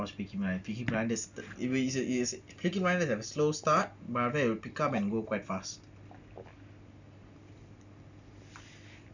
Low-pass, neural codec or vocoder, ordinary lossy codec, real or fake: 7.2 kHz; none; none; real